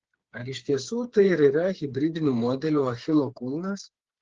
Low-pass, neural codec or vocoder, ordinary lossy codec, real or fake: 7.2 kHz; codec, 16 kHz, 4 kbps, FreqCodec, smaller model; Opus, 16 kbps; fake